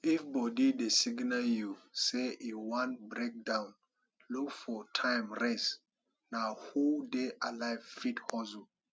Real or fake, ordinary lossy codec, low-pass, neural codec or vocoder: real; none; none; none